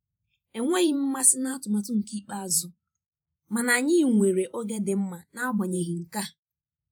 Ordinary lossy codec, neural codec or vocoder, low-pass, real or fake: none; vocoder, 48 kHz, 128 mel bands, Vocos; none; fake